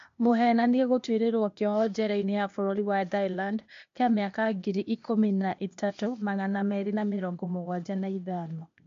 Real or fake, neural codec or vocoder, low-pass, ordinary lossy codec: fake; codec, 16 kHz, 0.8 kbps, ZipCodec; 7.2 kHz; MP3, 48 kbps